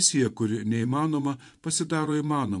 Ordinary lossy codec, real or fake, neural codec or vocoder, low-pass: MP3, 64 kbps; fake; vocoder, 24 kHz, 100 mel bands, Vocos; 10.8 kHz